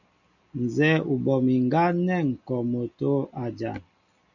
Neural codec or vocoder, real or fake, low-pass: none; real; 7.2 kHz